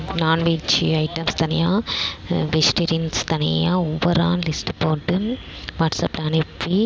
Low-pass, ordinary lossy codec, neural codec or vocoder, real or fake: none; none; none; real